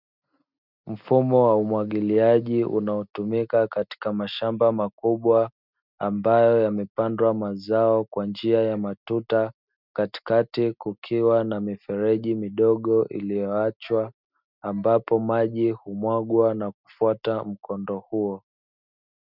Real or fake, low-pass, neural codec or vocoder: real; 5.4 kHz; none